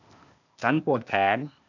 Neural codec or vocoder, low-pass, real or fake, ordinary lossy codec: codec, 16 kHz, 0.8 kbps, ZipCodec; 7.2 kHz; fake; none